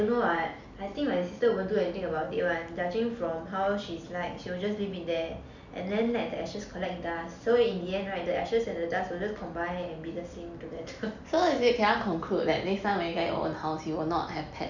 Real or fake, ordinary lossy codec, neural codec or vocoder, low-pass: real; none; none; 7.2 kHz